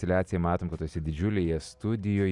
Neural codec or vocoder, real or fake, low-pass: none; real; 10.8 kHz